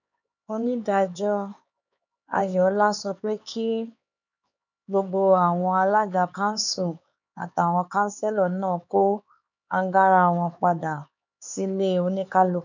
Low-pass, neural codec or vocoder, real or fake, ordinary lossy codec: 7.2 kHz; codec, 16 kHz, 4 kbps, X-Codec, HuBERT features, trained on LibriSpeech; fake; none